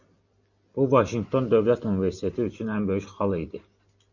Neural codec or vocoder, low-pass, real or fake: none; 7.2 kHz; real